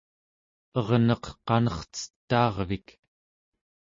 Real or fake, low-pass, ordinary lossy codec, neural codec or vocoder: real; 7.2 kHz; MP3, 32 kbps; none